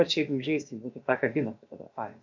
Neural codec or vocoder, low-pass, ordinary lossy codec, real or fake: codec, 16 kHz, about 1 kbps, DyCAST, with the encoder's durations; 7.2 kHz; MP3, 48 kbps; fake